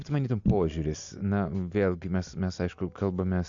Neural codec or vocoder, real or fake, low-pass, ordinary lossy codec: none; real; 7.2 kHz; AAC, 64 kbps